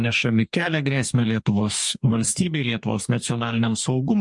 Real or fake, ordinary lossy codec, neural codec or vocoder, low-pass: fake; MP3, 64 kbps; codec, 44.1 kHz, 2.6 kbps, DAC; 10.8 kHz